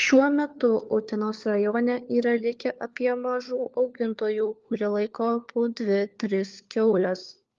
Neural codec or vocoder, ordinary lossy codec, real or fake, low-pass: codec, 16 kHz, 4 kbps, FunCodec, trained on LibriTTS, 50 frames a second; Opus, 32 kbps; fake; 7.2 kHz